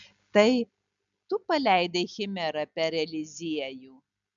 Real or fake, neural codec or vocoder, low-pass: real; none; 7.2 kHz